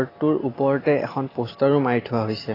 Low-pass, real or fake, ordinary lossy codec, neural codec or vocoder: 5.4 kHz; real; AAC, 24 kbps; none